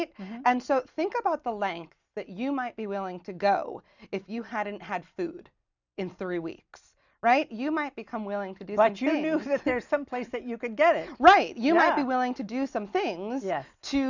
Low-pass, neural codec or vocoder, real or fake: 7.2 kHz; none; real